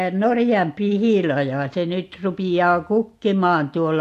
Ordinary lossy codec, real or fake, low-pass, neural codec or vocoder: AAC, 64 kbps; real; 14.4 kHz; none